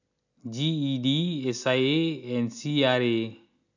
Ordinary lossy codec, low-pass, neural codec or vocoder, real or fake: none; 7.2 kHz; none; real